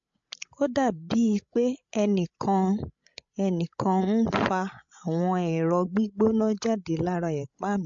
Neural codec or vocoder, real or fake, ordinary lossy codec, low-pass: codec, 16 kHz, 16 kbps, FreqCodec, larger model; fake; MP3, 48 kbps; 7.2 kHz